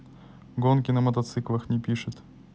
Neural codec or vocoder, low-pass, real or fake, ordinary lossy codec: none; none; real; none